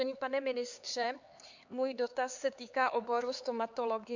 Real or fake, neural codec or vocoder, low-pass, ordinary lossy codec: fake; codec, 16 kHz, 4 kbps, X-Codec, HuBERT features, trained on LibriSpeech; 7.2 kHz; Opus, 64 kbps